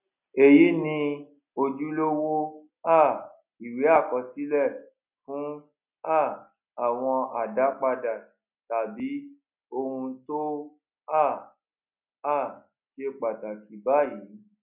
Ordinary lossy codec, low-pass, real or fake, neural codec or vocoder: none; 3.6 kHz; real; none